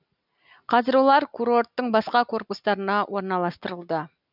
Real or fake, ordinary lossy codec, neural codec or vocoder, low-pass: real; none; none; 5.4 kHz